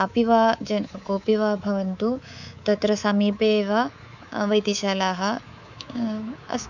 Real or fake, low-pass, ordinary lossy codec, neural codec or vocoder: fake; 7.2 kHz; none; codec, 24 kHz, 3.1 kbps, DualCodec